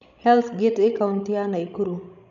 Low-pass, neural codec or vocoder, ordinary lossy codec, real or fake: 7.2 kHz; codec, 16 kHz, 16 kbps, FreqCodec, larger model; none; fake